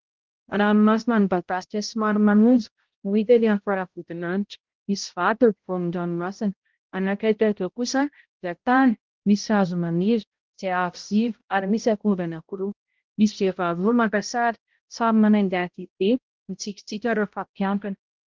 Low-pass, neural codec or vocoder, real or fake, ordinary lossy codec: 7.2 kHz; codec, 16 kHz, 0.5 kbps, X-Codec, HuBERT features, trained on balanced general audio; fake; Opus, 16 kbps